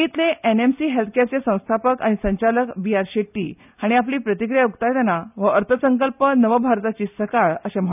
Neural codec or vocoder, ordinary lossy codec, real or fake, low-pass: none; none; real; 3.6 kHz